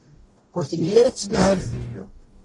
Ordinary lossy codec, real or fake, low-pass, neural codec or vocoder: AAC, 32 kbps; fake; 10.8 kHz; codec, 44.1 kHz, 0.9 kbps, DAC